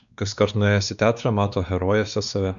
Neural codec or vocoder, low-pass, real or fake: codec, 16 kHz, 2 kbps, X-Codec, WavLM features, trained on Multilingual LibriSpeech; 7.2 kHz; fake